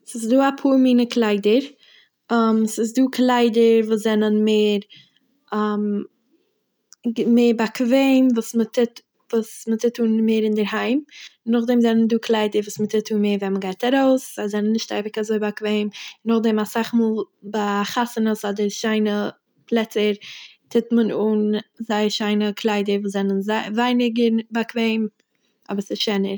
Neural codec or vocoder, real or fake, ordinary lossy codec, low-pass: none; real; none; none